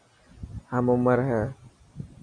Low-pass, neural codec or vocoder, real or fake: 9.9 kHz; none; real